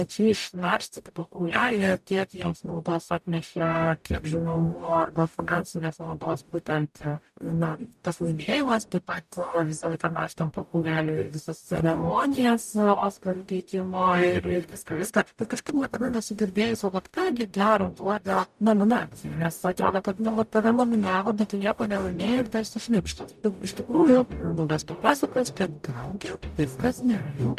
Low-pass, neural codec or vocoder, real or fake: 14.4 kHz; codec, 44.1 kHz, 0.9 kbps, DAC; fake